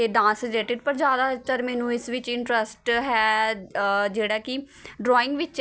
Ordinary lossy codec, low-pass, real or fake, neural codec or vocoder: none; none; real; none